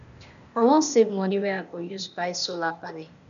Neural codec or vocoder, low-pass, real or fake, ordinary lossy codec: codec, 16 kHz, 0.8 kbps, ZipCodec; 7.2 kHz; fake; none